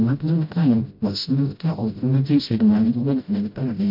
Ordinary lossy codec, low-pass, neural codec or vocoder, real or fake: MP3, 32 kbps; 5.4 kHz; codec, 16 kHz, 0.5 kbps, FreqCodec, smaller model; fake